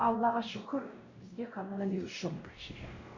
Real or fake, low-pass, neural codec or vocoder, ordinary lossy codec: fake; 7.2 kHz; codec, 16 kHz, 0.5 kbps, X-Codec, WavLM features, trained on Multilingual LibriSpeech; Opus, 64 kbps